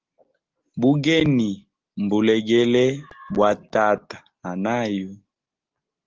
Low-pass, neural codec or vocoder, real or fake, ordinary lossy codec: 7.2 kHz; none; real; Opus, 16 kbps